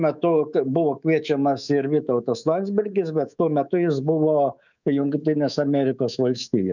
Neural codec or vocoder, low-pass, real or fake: codec, 24 kHz, 3.1 kbps, DualCodec; 7.2 kHz; fake